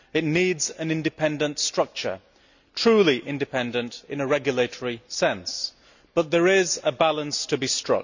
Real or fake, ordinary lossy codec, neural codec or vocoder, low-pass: real; none; none; 7.2 kHz